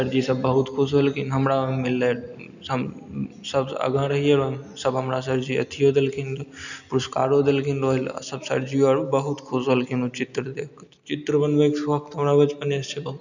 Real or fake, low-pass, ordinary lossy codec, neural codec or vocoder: real; 7.2 kHz; none; none